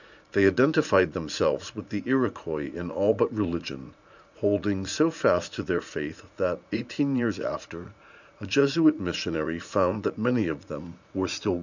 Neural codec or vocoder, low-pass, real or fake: vocoder, 44.1 kHz, 80 mel bands, Vocos; 7.2 kHz; fake